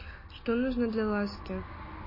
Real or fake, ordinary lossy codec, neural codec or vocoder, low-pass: real; MP3, 24 kbps; none; 5.4 kHz